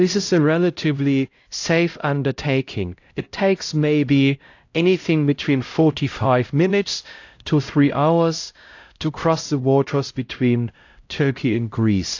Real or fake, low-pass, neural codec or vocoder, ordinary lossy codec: fake; 7.2 kHz; codec, 16 kHz, 0.5 kbps, X-Codec, HuBERT features, trained on LibriSpeech; AAC, 48 kbps